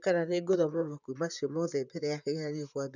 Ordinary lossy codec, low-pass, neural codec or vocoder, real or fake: none; 7.2 kHz; vocoder, 44.1 kHz, 128 mel bands, Pupu-Vocoder; fake